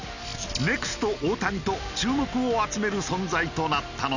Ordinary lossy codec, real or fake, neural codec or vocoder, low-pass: none; real; none; 7.2 kHz